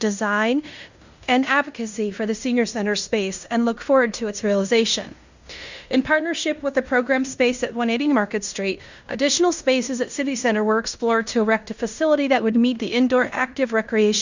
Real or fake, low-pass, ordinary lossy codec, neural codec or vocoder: fake; 7.2 kHz; Opus, 64 kbps; codec, 16 kHz in and 24 kHz out, 0.9 kbps, LongCat-Audio-Codec, fine tuned four codebook decoder